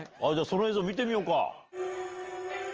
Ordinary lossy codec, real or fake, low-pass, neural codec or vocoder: Opus, 24 kbps; real; 7.2 kHz; none